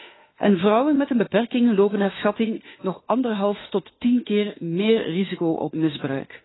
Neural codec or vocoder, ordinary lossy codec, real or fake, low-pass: autoencoder, 48 kHz, 32 numbers a frame, DAC-VAE, trained on Japanese speech; AAC, 16 kbps; fake; 7.2 kHz